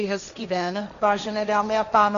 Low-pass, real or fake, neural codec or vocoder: 7.2 kHz; fake; codec, 16 kHz, 1.1 kbps, Voila-Tokenizer